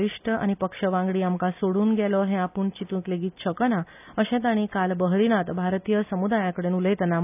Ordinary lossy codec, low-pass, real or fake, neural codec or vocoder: none; 3.6 kHz; real; none